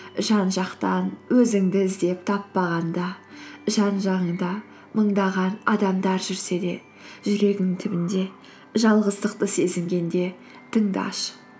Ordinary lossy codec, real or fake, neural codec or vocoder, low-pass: none; real; none; none